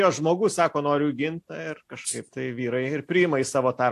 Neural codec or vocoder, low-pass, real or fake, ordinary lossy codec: none; 14.4 kHz; real; AAC, 64 kbps